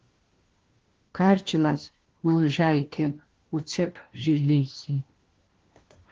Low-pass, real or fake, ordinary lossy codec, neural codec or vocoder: 7.2 kHz; fake; Opus, 16 kbps; codec, 16 kHz, 1 kbps, FunCodec, trained on LibriTTS, 50 frames a second